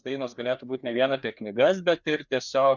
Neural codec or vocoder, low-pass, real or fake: codec, 16 kHz, 2 kbps, FreqCodec, larger model; 7.2 kHz; fake